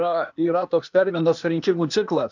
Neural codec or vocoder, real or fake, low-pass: codec, 16 kHz, 0.8 kbps, ZipCodec; fake; 7.2 kHz